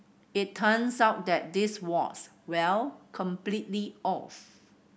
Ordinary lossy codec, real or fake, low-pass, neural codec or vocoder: none; real; none; none